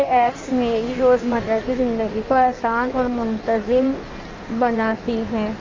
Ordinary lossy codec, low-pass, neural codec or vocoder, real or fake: Opus, 32 kbps; 7.2 kHz; codec, 16 kHz in and 24 kHz out, 1.1 kbps, FireRedTTS-2 codec; fake